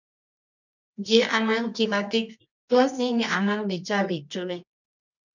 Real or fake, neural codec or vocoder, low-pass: fake; codec, 24 kHz, 0.9 kbps, WavTokenizer, medium music audio release; 7.2 kHz